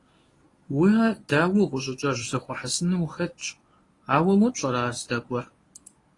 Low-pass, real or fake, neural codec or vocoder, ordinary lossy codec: 10.8 kHz; fake; codec, 24 kHz, 0.9 kbps, WavTokenizer, medium speech release version 1; AAC, 32 kbps